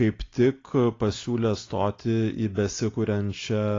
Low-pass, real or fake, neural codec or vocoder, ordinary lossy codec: 7.2 kHz; real; none; AAC, 32 kbps